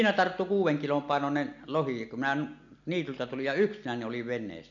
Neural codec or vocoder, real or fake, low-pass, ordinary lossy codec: none; real; 7.2 kHz; none